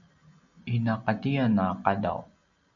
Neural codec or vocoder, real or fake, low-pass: none; real; 7.2 kHz